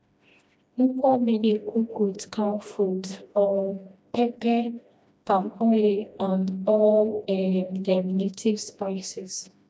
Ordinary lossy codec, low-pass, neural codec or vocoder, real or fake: none; none; codec, 16 kHz, 1 kbps, FreqCodec, smaller model; fake